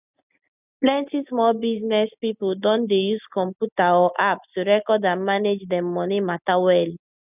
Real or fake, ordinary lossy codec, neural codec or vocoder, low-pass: real; none; none; 3.6 kHz